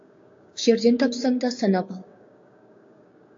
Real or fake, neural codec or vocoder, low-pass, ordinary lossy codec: fake; codec, 16 kHz, 6 kbps, DAC; 7.2 kHz; AAC, 48 kbps